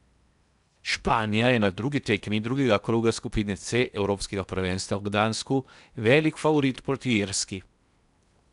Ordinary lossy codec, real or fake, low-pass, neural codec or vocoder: none; fake; 10.8 kHz; codec, 16 kHz in and 24 kHz out, 0.8 kbps, FocalCodec, streaming, 65536 codes